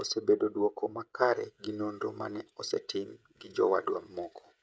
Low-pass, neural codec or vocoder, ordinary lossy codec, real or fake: none; codec, 16 kHz, 16 kbps, FreqCodec, smaller model; none; fake